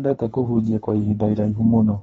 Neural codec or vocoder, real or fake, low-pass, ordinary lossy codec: codec, 24 kHz, 3 kbps, HILCodec; fake; 10.8 kHz; AAC, 24 kbps